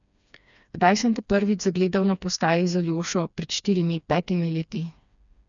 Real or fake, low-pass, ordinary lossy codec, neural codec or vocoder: fake; 7.2 kHz; none; codec, 16 kHz, 2 kbps, FreqCodec, smaller model